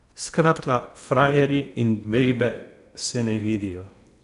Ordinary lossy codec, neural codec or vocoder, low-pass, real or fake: none; codec, 16 kHz in and 24 kHz out, 0.8 kbps, FocalCodec, streaming, 65536 codes; 10.8 kHz; fake